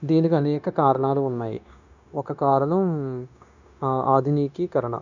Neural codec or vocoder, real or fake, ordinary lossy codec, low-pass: codec, 16 kHz, 0.9 kbps, LongCat-Audio-Codec; fake; none; 7.2 kHz